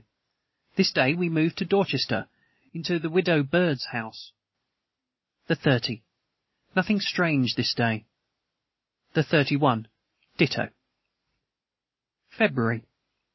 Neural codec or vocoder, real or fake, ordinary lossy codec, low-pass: none; real; MP3, 24 kbps; 7.2 kHz